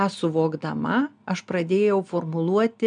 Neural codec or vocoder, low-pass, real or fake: none; 9.9 kHz; real